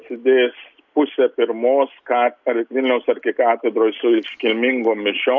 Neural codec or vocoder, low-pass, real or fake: none; 7.2 kHz; real